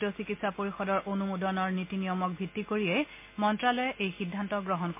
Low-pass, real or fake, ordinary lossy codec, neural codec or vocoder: 3.6 kHz; real; MP3, 24 kbps; none